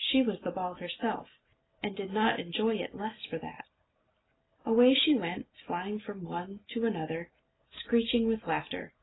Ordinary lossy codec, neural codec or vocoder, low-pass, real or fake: AAC, 16 kbps; none; 7.2 kHz; real